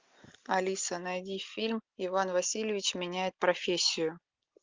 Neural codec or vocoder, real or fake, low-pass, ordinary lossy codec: none; real; 7.2 kHz; Opus, 24 kbps